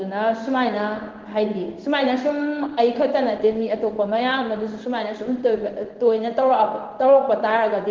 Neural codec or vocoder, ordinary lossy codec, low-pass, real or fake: codec, 16 kHz in and 24 kHz out, 1 kbps, XY-Tokenizer; Opus, 16 kbps; 7.2 kHz; fake